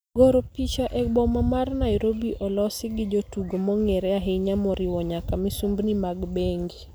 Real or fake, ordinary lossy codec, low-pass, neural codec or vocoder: real; none; none; none